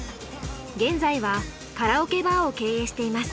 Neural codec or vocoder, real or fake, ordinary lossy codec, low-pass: none; real; none; none